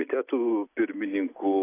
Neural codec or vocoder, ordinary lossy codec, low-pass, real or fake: none; AAC, 24 kbps; 3.6 kHz; real